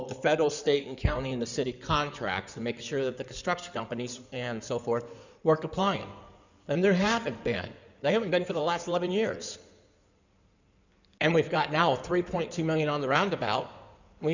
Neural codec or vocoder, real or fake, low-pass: codec, 16 kHz in and 24 kHz out, 2.2 kbps, FireRedTTS-2 codec; fake; 7.2 kHz